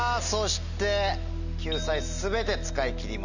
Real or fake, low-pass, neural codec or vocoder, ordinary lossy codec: real; 7.2 kHz; none; none